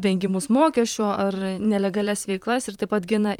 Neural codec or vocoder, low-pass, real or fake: vocoder, 44.1 kHz, 128 mel bands, Pupu-Vocoder; 19.8 kHz; fake